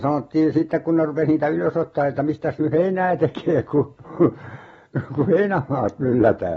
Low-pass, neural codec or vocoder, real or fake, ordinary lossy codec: 19.8 kHz; none; real; AAC, 24 kbps